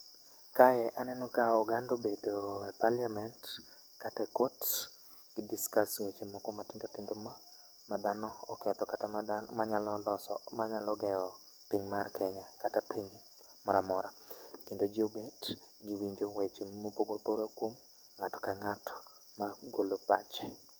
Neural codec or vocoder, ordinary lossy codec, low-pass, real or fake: codec, 44.1 kHz, 7.8 kbps, DAC; none; none; fake